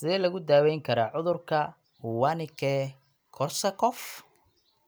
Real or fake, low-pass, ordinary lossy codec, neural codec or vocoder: real; none; none; none